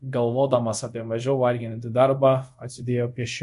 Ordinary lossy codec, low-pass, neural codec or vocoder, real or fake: MP3, 64 kbps; 10.8 kHz; codec, 24 kHz, 0.5 kbps, DualCodec; fake